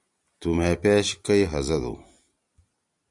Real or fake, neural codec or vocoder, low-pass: real; none; 10.8 kHz